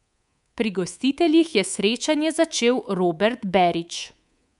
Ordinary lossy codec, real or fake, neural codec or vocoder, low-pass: none; fake; codec, 24 kHz, 3.1 kbps, DualCodec; 10.8 kHz